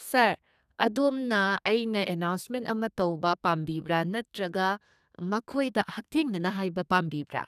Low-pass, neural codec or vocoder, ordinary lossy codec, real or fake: 14.4 kHz; codec, 32 kHz, 1.9 kbps, SNAC; none; fake